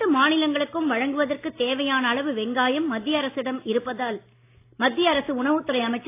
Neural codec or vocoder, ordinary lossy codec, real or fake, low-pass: none; AAC, 24 kbps; real; 3.6 kHz